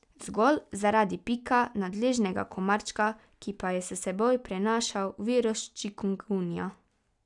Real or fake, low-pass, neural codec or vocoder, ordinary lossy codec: real; 10.8 kHz; none; none